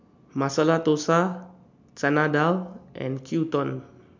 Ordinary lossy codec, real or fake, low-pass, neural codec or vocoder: MP3, 64 kbps; real; 7.2 kHz; none